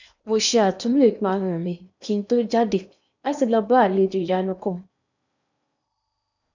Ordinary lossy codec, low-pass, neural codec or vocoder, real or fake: none; 7.2 kHz; codec, 16 kHz in and 24 kHz out, 0.8 kbps, FocalCodec, streaming, 65536 codes; fake